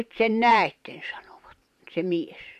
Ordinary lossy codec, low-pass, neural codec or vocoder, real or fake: none; 14.4 kHz; vocoder, 44.1 kHz, 128 mel bands every 512 samples, BigVGAN v2; fake